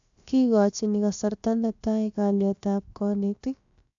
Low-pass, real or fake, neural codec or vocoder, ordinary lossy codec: 7.2 kHz; fake; codec, 16 kHz, about 1 kbps, DyCAST, with the encoder's durations; AAC, 64 kbps